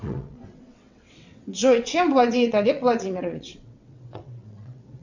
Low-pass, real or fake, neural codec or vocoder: 7.2 kHz; fake; vocoder, 22.05 kHz, 80 mel bands, WaveNeXt